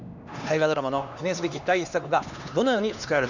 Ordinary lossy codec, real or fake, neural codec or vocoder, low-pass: none; fake; codec, 16 kHz, 2 kbps, X-Codec, HuBERT features, trained on LibriSpeech; 7.2 kHz